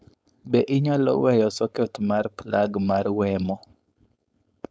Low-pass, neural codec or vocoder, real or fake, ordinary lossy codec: none; codec, 16 kHz, 4.8 kbps, FACodec; fake; none